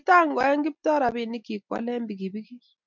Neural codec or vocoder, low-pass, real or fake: none; 7.2 kHz; real